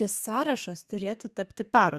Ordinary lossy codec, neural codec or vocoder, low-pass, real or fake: Opus, 64 kbps; codec, 32 kHz, 1.9 kbps, SNAC; 14.4 kHz; fake